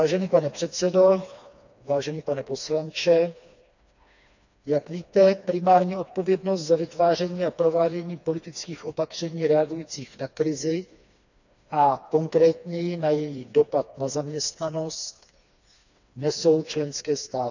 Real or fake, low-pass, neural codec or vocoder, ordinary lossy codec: fake; 7.2 kHz; codec, 16 kHz, 2 kbps, FreqCodec, smaller model; none